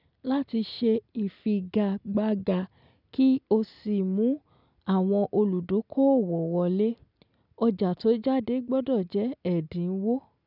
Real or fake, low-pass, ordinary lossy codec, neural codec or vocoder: real; 5.4 kHz; none; none